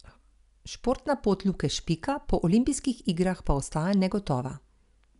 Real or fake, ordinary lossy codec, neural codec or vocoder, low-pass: real; none; none; 10.8 kHz